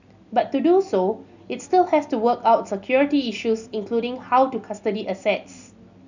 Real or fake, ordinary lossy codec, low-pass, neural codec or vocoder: real; none; 7.2 kHz; none